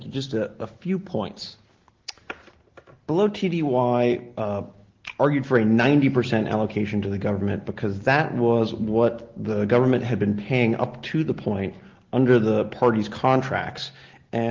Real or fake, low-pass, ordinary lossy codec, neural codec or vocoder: real; 7.2 kHz; Opus, 16 kbps; none